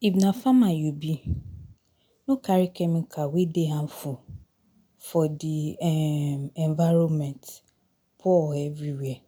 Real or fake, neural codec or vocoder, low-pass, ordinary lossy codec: real; none; 19.8 kHz; none